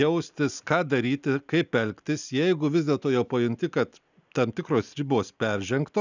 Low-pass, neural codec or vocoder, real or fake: 7.2 kHz; none; real